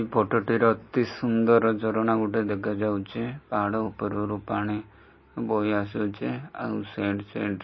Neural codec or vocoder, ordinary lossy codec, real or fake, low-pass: none; MP3, 24 kbps; real; 7.2 kHz